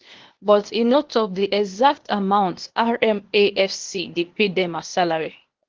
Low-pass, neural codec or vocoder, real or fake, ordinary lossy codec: 7.2 kHz; codec, 16 kHz, 0.8 kbps, ZipCodec; fake; Opus, 16 kbps